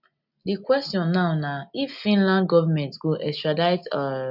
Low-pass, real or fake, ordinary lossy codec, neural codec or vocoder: 5.4 kHz; real; none; none